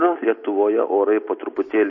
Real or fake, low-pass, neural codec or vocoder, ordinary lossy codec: real; 7.2 kHz; none; MP3, 32 kbps